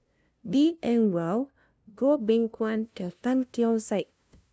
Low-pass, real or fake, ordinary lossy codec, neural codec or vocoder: none; fake; none; codec, 16 kHz, 0.5 kbps, FunCodec, trained on LibriTTS, 25 frames a second